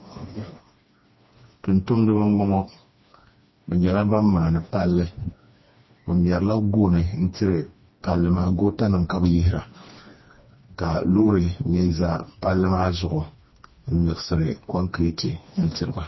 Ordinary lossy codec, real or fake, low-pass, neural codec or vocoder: MP3, 24 kbps; fake; 7.2 kHz; codec, 16 kHz, 2 kbps, FreqCodec, smaller model